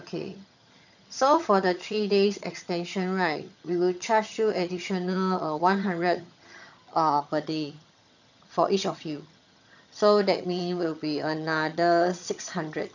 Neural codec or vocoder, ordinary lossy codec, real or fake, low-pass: vocoder, 22.05 kHz, 80 mel bands, HiFi-GAN; none; fake; 7.2 kHz